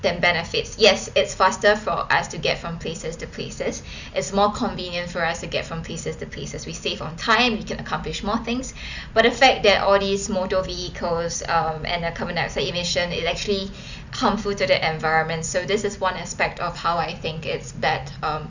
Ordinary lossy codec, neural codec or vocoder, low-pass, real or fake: none; none; 7.2 kHz; real